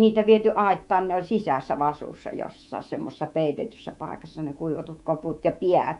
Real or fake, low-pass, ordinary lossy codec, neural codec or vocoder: fake; 10.8 kHz; none; codec, 24 kHz, 3.1 kbps, DualCodec